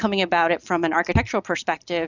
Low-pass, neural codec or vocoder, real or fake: 7.2 kHz; none; real